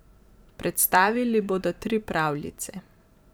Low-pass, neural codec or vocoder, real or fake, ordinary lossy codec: none; vocoder, 44.1 kHz, 128 mel bands every 512 samples, BigVGAN v2; fake; none